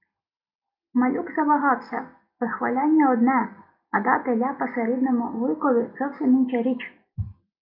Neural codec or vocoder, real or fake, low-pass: none; real; 5.4 kHz